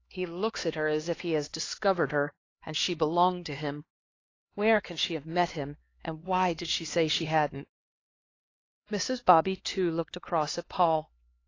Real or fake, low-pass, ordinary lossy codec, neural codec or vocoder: fake; 7.2 kHz; AAC, 32 kbps; codec, 16 kHz, 2 kbps, X-Codec, HuBERT features, trained on LibriSpeech